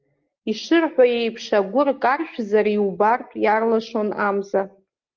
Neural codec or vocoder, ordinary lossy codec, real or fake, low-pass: none; Opus, 32 kbps; real; 7.2 kHz